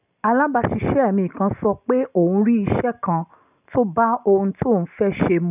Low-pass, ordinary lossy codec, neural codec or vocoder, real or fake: 3.6 kHz; none; none; real